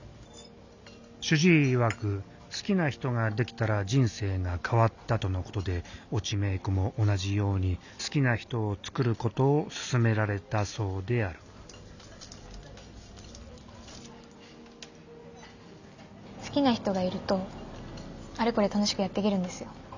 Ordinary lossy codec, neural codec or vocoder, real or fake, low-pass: none; none; real; 7.2 kHz